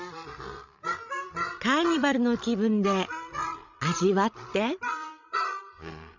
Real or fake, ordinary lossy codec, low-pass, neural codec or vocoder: fake; none; 7.2 kHz; codec, 16 kHz, 16 kbps, FreqCodec, larger model